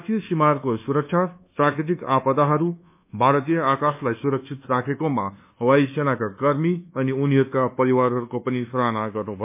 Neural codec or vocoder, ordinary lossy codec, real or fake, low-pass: codec, 24 kHz, 1.2 kbps, DualCodec; MP3, 32 kbps; fake; 3.6 kHz